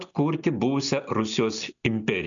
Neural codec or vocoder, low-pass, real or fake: none; 7.2 kHz; real